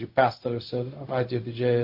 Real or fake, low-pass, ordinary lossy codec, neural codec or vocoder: fake; 5.4 kHz; MP3, 32 kbps; codec, 16 kHz, 0.4 kbps, LongCat-Audio-Codec